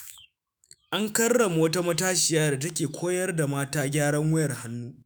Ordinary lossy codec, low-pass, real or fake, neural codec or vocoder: none; none; fake; autoencoder, 48 kHz, 128 numbers a frame, DAC-VAE, trained on Japanese speech